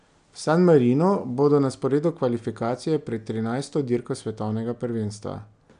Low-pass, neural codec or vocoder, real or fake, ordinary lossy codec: 9.9 kHz; none; real; none